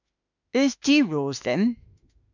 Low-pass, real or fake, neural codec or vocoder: 7.2 kHz; fake; autoencoder, 48 kHz, 32 numbers a frame, DAC-VAE, trained on Japanese speech